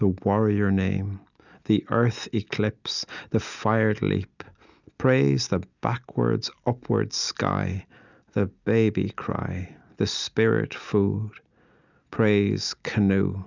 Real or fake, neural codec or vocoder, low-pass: real; none; 7.2 kHz